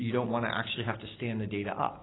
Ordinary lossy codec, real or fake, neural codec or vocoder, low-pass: AAC, 16 kbps; real; none; 7.2 kHz